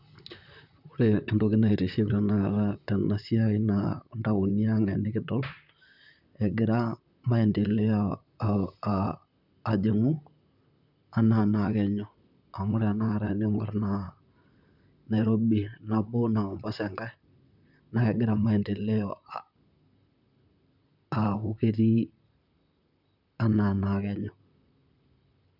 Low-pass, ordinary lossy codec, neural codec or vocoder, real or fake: 5.4 kHz; none; vocoder, 44.1 kHz, 128 mel bands, Pupu-Vocoder; fake